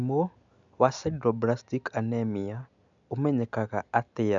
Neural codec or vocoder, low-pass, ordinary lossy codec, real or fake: none; 7.2 kHz; none; real